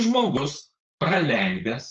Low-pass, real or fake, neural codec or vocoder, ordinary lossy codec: 7.2 kHz; fake; codec, 16 kHz, 4.8 kbps, FACodec; Opus, 24 kbps